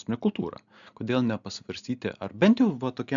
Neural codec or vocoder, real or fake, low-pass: none; real; 7.2 kHz